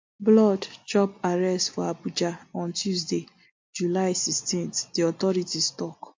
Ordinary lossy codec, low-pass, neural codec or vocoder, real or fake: MP3, 48 kbps; 7.2 kHz; none; real